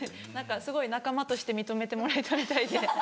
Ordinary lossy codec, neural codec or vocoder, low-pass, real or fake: none; none; none; real